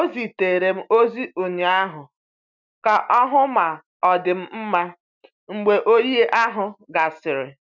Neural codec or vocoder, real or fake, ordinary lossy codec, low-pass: none; real; none; 7.2 kHz